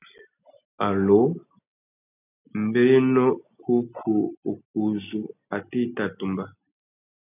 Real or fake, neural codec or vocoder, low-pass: real; none; 3.6 kHz